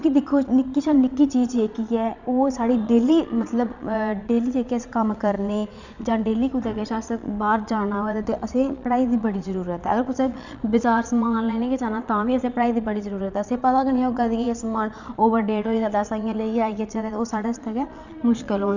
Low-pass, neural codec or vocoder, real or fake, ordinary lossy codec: 7.2 kHz; vocoder, 22.05 kHz, 80 mel bands, Vocos; fake; none